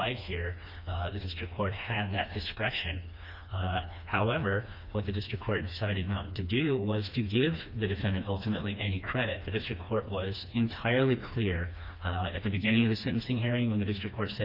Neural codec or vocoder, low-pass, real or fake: codec, 16 kHz, 2 kbps, FreqCodec, smaller model; 5.4 kHz; fake